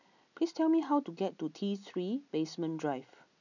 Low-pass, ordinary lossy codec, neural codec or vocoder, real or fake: 7.2 kHz; none; none; real